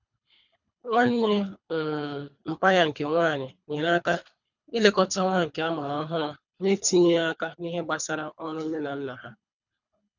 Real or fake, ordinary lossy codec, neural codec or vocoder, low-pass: fake; none; codec, 24 kHz, 3 kbps, HILCodec; 7.2 kHz